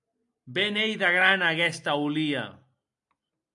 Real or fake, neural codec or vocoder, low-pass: real; none; 10.8 kHz